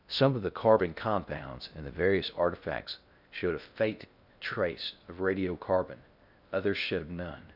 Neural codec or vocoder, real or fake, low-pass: codec, 16 kHz in and 24 kHz out, 0.6 kbps, FocalCodec, streaming, 4096 codes; fake; 5.4 kHz